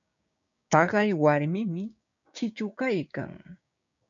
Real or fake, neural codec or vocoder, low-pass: fake; codec, 16 kHz, 6 kbps, DAC; 7.2 kHz